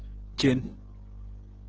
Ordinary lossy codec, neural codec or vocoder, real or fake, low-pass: Opus, 16 kbps; none; real; 7.2 kHz